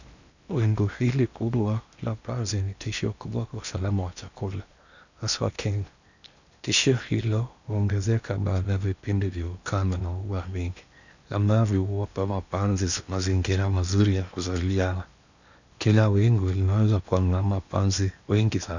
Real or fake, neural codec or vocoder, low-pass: fake; codec, 16 kHz in and 24 kHz out, 0.8 kbps, FocalCodec, streaming, 65536 codes; 7.2 kHz